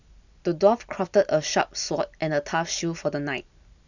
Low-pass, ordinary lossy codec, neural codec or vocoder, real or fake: 7.2 kHz; none; none; real